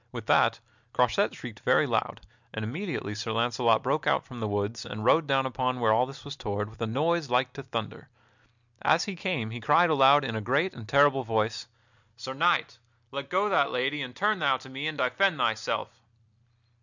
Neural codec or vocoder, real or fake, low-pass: none; real; 7.2 kHz